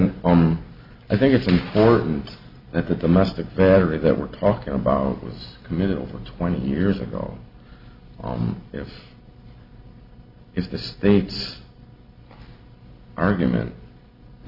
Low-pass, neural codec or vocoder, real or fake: 5.4 kHz; none; real